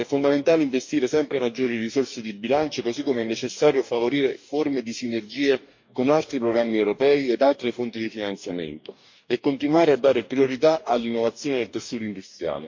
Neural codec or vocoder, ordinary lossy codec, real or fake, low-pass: codec, 44.1 kHz, 2.6 kbps, DAC; MP3, 48 kbps; fake; 7.2 kHz